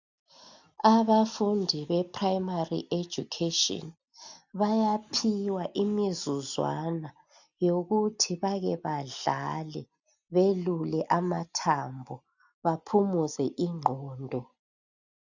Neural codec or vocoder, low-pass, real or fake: none; 7.2 kHz; real